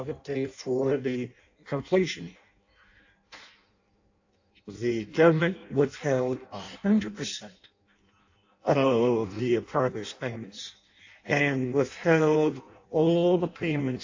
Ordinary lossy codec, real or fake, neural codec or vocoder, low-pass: AAC, 48 kbps; fake; codec, 16 kHz in and 24 kHz out, 0.6 kbps, FireRedTTS-2 codec; 7.2 kHz